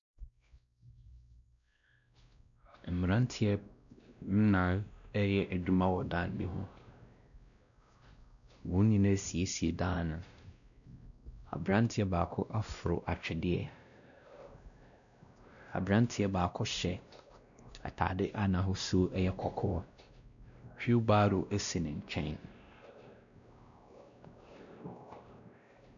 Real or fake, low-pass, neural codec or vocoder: fake; 7.2 kHz; codec, 16 kHz, 1 kbps, X-Codec, WavLM features, trained on Multilingual LibriSpeech